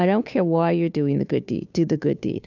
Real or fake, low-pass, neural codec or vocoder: fake; 7.2 kHz; codec, 16 kHz, 2 kbps, FunCodec, trained on Chinese and English, 25 frames a second